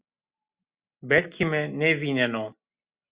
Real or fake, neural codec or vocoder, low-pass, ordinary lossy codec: real; none; 3.6 kHz; Opus, 64 kbps